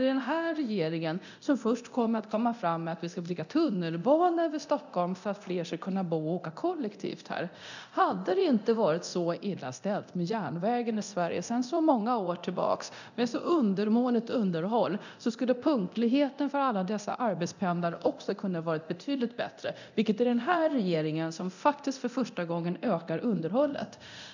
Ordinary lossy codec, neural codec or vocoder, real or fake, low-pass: none; codec, 24 kHz, 0.9 kbps, DualCodec; fake; 7.2 kHz